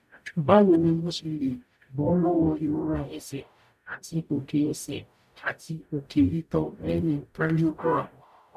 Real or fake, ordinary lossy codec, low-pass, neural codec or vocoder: fake; none; 14.4 kHz; codec, 44.1 kHz, 0.9 kbps, DAC